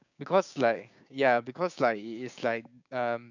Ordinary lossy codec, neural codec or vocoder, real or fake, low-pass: none; codec, 16 kHz, 6 kbps, DAC; fake; 7.2 kHz